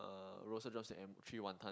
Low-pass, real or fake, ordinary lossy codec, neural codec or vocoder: none; real; none; none